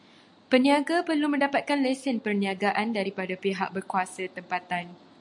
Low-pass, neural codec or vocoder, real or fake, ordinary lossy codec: 10.8 kHz; none; real; MP3, 64 kbps